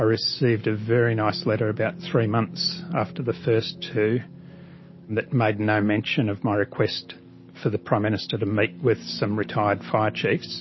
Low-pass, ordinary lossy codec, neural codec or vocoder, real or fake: 7.2 kHz; MP3, 24 kbps; none; real